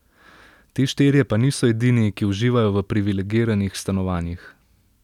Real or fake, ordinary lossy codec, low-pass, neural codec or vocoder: real; none; 19.8 kHz; none